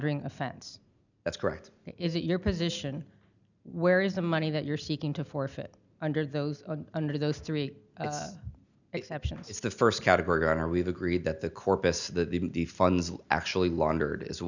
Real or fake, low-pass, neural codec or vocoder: real; 7.2 kHz; none